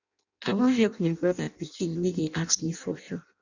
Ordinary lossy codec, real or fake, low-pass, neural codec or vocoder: none; fake; 7.2 kHz; codec, 16 kHz in and 24 kHz out, 0.6 kbps, FireRedTTS-2 codec